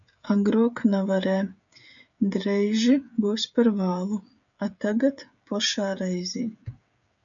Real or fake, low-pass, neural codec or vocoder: fake; 7.2 kHz; codec, 16 kHz, 16 kbps, FreqCodec, smaller model